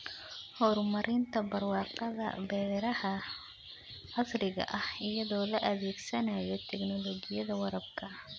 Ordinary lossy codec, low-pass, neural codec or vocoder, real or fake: none; none; none; real